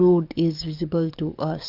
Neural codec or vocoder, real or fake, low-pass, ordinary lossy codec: codec, 44.1 kHz, 7.8 kbps, DAC; fake; 5.4 kHz; Opus, 24 kbps